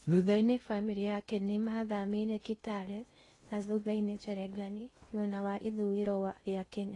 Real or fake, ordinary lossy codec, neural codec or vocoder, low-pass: fake; AAC, 32 kbps; codec, 16 kHz in and 24 kHz out, 0.6 kbps, FocalCodec, streaming, 2048 codes; 10.8 kHz